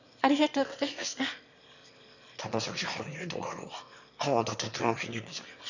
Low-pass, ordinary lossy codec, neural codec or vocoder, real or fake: 7.2 kHz; none; autoencoder, 22.05 kHz, a latent of 192 numbers a frame, VITS, trained on one speaker; fake